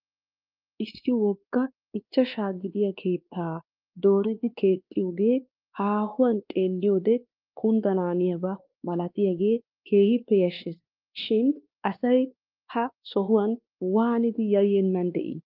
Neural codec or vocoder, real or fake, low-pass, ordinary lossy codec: codec, 16 kHz, 2 kbps, X-Codec, WavLM features, trained on Multilingual LibriSpeech; fake; 5.4 kHz; Opus, 24 kbps